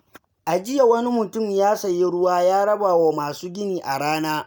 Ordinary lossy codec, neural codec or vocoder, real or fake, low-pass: none; none; real; none